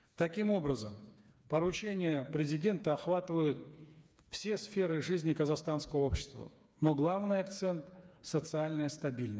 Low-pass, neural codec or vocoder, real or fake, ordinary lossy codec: none; codec, 16 kHz, 4 kbps, FreqCodec, smaller model; fake; none